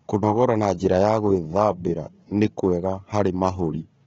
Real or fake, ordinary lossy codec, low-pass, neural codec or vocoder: fake; AAC, 24 kbps; 7.2 kHz; codec, 16 kHz, 4 kbps, FunCodec, trained on Chinese and English, 50 frames a second